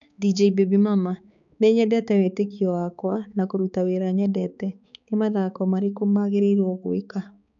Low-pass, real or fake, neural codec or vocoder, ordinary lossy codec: 7.2 kHz; fake; codec, 16 kHz, 4 kbps, X-Codec, HuBERT features, trained on balanced general audio; none